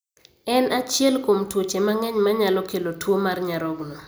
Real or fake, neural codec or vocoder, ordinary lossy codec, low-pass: real; none; none; none